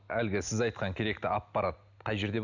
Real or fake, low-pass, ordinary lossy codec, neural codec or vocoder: real; 7.2 kHz; none; none